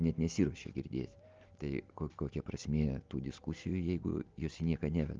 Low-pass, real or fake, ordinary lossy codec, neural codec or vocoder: 7.2 kHz; real; Opus, 32 kbps; none